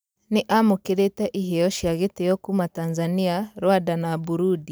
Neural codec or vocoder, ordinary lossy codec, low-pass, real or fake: none; none; none; real